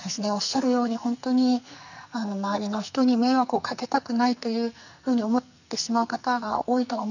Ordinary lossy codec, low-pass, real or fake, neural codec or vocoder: none; 7.2 kHz; fake; codec, 44.1 kHz, 2.6 kbps, SNAC